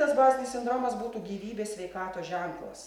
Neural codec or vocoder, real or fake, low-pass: none; real; 19.8 kHz